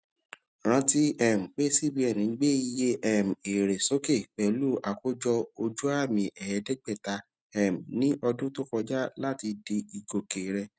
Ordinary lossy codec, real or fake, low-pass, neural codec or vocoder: none; real; none; none